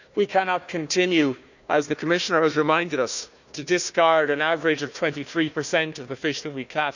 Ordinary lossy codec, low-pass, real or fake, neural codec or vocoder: none; 7.2 kHz; fake; codec, 16 kHz, 1 kbps, FunCodec, trained on Chinese and English, 50 frames a second